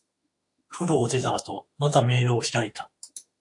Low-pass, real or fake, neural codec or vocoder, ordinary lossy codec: 10.8 kHz; fake; autoencoder, 48 kHz, 32 numbers a frame, DAC-VAE, trained on Japanese speech; AAC, 64 kbps